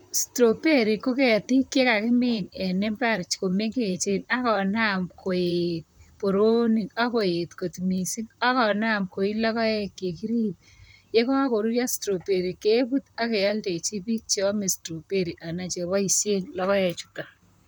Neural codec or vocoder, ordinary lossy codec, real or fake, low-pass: vocoder, 44.1 kHz, 128 mel bands, Pupu-Vocoder; none; fake; none